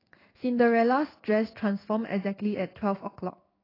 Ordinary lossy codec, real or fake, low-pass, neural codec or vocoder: AAC, 24 kbps; fake; 5.4 kHz; codec, 16 kHz in and 24 kHz out, 1 kbps, XY-Tokenizer